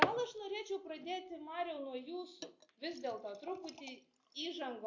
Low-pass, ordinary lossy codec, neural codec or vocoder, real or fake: 7.2 kHz; AAC, 48 kbps; none; real